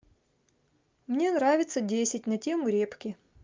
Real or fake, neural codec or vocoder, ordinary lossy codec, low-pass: real; none; Opus, 32 kbps; 7.2 kHz